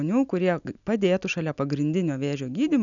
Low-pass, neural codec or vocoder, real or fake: 7.2 kHz; none; real